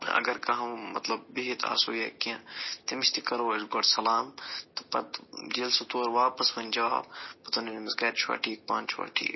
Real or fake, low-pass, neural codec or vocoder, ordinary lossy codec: real; 7.2 kHz; none; MP3, 24 kbps